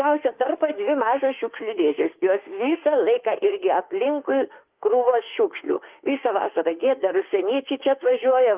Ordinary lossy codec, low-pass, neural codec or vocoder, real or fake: Opus, 32 kbps; 3.6 kHz; autoencoder, 48 kHz, 32 numbers a frame, DAC-VAE, trained on Japanese speech; fake